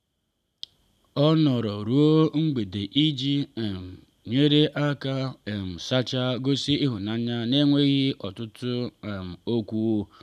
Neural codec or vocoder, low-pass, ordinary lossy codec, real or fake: none; 14.4 kHz; none; real